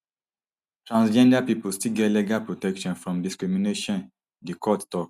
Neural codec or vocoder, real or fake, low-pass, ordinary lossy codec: none; real; 14.4 kHz; none